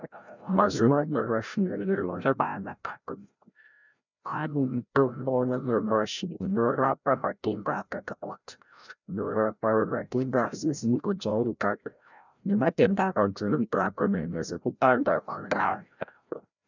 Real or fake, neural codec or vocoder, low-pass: fake; codec, 16 kHz, 0.5 kbps, FreqCodec, larger model; 7.2 kHz